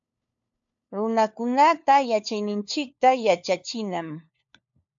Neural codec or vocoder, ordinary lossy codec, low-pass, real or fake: codec, 16 kHz, 4 kbps, FunCodec, trained on LibriTTS, 50 frames a second; AAC, 64 kbps; 7.2 kHz; fake